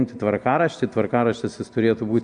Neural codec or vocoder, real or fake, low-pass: none; real; 9.9 kHz